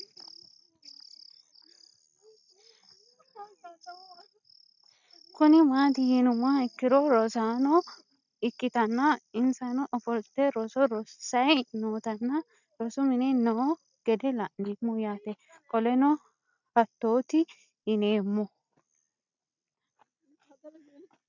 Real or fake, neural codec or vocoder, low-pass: real; none; 7.2 kHz